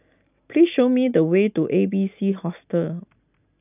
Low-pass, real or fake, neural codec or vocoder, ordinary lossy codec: 3.6 kHz; real; none; none